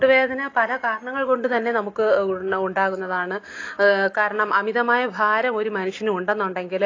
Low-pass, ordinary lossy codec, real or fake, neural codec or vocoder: 7.2 kHz; AAC, 32 kbps; real; none